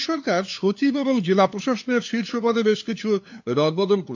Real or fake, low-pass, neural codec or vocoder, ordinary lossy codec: fake; 7.2 kHz; codec, 16 kHz, 2 kbps, FunCodec, trained on LibriTTS, 25 frames a second; AAC, 48 kbps